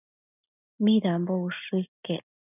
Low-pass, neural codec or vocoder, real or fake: 3.6 kHz; none; real